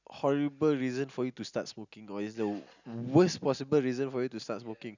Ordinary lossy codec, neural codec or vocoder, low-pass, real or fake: none; none; 7.2 kHz; real